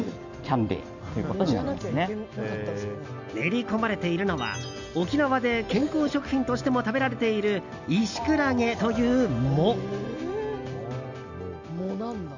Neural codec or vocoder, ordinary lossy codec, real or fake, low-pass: none; none; real; 7.2 kHz